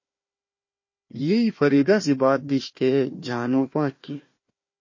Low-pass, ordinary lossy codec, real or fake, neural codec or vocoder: 7.2 kHz; MP3, 32 kbps; fake; codec, 16 kHz, 1 kbps, FunCodec, trained on Chinese and English, 50 frames a second